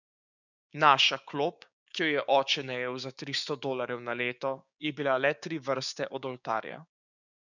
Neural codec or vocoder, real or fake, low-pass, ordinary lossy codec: codec, 16 kHz, 6 kbps, DAC; fake; 7.2 kHz; none